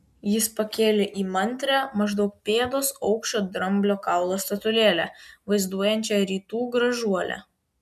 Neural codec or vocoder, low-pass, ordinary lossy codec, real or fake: none; 14.4 kHz; MP3, 96 kbps; real